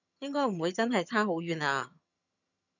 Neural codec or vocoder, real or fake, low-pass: vocoder, 22.05 kHz, 80 mel bands, HiFi-GAN; fake; 7.2 kHz